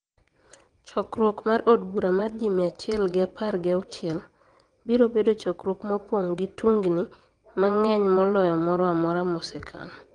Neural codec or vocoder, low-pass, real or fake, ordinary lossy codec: vocoder, 22.05 kHz, 80 mel bands, WaveNeXt; 9.9 kHz; fake; Opus, 24 kbps